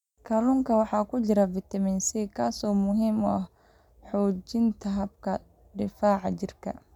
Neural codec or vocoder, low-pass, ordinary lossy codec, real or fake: none; 19.8 kHz; none; real